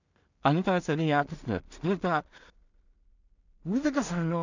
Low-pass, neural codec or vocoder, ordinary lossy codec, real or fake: 7.2 kHz; codec, 16 kHz in and 24 kHz out, 0.4 kbps, LongCat-Audio-Codec, two codebook decoder; none; fake